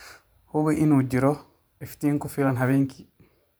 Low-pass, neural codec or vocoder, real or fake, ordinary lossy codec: none; vocoder, 44.1 kHz, 128 mel bands every 512 samples, BigVGAN v2; fake; none